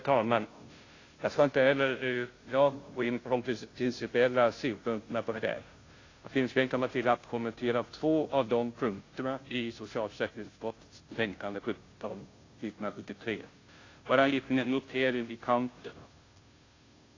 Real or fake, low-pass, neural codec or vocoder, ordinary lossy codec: fake; 7.2 kHz; codec, 16 kHz, 0.5 kbps, FunCodec, trained on Chinese and English, 25 frames a second; AAC, 32 kbps